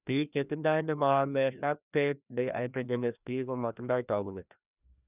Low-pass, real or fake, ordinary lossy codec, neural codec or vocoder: 3.6 kHz; fake; none; codec, 16 kHz, 1 kbps, FreqCodec, larger model